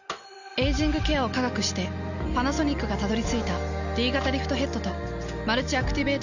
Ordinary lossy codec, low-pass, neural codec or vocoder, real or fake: none; 7.2 kHz; none; real